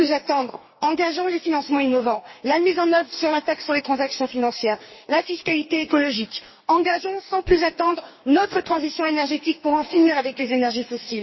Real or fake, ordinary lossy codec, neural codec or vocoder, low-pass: fake; MP3, 24 kbps; codec, 44.1 kHz, 2.6 kbps, DAC; 7.2 kHz